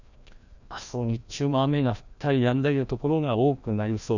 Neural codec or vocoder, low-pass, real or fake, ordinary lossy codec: codec, 16 kHz, 1 kbps, FreqCodec, larger model; 7.2 kHz; fake; none